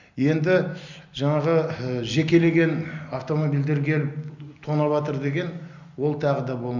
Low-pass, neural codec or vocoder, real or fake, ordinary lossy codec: 7.2 kHz; none; real; none